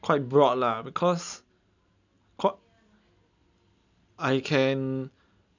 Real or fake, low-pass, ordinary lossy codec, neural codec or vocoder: real; 7.2 kHz; none; none